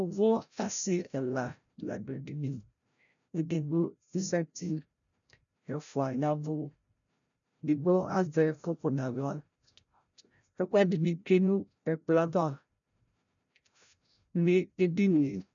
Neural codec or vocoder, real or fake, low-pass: codec, 16 kHz, 0.5 kbps, FreqCodec, larger model; fake; 7.2 kHz